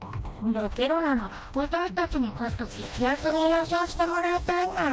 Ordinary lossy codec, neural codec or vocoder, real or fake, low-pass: none; codec, 16 kHz, 1 kbps, FreqCodec, smaller model; fake; none